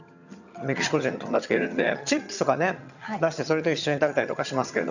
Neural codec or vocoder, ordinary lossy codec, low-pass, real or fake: vocoder, 22.05 kHz, 80 mel bands, HiFi-GAN; none; 7.2 kHz; fake